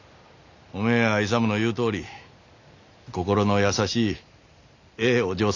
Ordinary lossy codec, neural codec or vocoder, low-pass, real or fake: none; none; 7.2 kHz; real